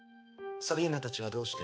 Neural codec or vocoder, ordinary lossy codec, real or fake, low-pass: codec, 16 kHz, 2 kbps, X-Codec, HuBERT features, trained on balanced general audio; none; fake; none